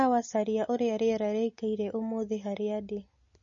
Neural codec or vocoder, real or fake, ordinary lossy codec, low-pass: none; real; MP3, 32 kbps; 7.2 kHz